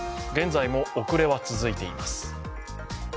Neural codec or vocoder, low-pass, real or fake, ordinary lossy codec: none; none; real; none